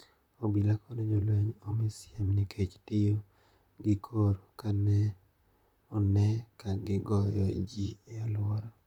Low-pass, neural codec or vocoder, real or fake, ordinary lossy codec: 19.8 kHz; vocoder, 44.1 kHz, 128 mel bands, Pupu-Vocoder; fake; none